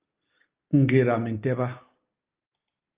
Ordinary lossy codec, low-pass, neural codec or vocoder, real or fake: Opus, 24 kbps; 3.6 kHz; none; real